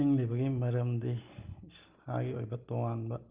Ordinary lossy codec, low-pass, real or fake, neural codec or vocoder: Opus, 32 kbps; 3.6 kHz; real; none